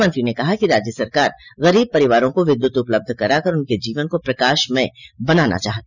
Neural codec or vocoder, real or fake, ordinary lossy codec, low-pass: none; real; none; 7.2 kHz